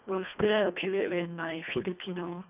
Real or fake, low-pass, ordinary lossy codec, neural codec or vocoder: fake; 3.6 kHz; none; codec, 24 kHz, 1.5 kbps, HILCodec